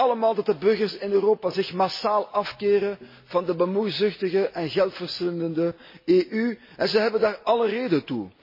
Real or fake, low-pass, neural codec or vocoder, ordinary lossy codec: real; 5.4 kHz; none; MP3, 24 kbps